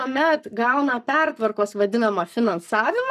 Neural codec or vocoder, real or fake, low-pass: codec, 44.1 kHz, 7.8 kbps, Pupu-Codec; fake; 14.4 kHz